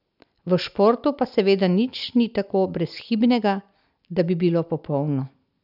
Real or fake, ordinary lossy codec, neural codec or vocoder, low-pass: real; none; none; 5.4 kHz